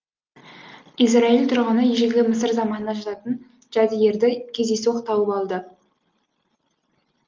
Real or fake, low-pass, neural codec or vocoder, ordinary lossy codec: real; 7.2 kHz; none; Opus, 32 kbps